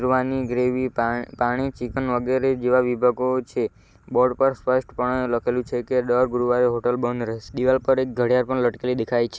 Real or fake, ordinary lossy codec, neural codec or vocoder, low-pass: real; none; none; none